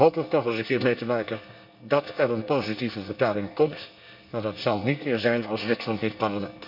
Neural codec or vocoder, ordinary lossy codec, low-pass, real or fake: codec, 24 kHz, 1 kbps, SNAC; none; 5.4 kHz; fake